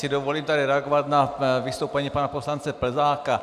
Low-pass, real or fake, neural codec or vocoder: 14.4 kHz; real; none